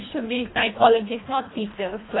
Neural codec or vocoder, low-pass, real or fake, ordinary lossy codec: codec, 24 kHz, 1.5 kbps, HILCodec; 7.2 kHz; fake; AAC, 16 kbps